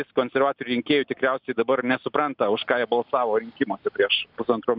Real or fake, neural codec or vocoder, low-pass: real; none; 5.4 kHz